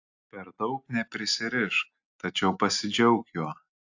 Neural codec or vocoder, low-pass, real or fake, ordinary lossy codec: none; 7.2 kHz; real; AAC, 48 kbps